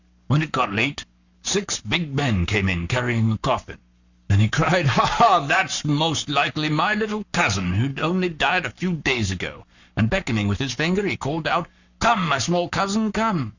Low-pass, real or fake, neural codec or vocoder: 7.2 kHz; fake; codec, 44.1 kHz, 7.8 kbps, Pupu-Codec